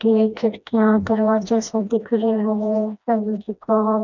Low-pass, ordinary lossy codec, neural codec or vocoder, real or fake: 7.2 kHz; none; codec, 16 kHz, 1 kbps, FreqCodec, smaller model; fake